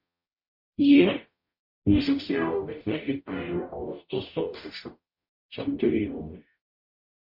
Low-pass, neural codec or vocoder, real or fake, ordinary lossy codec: 5.4 kHz; codec, 44.1 kHz, 0.9 kbps, DAC; fake; MP3, 32 kbps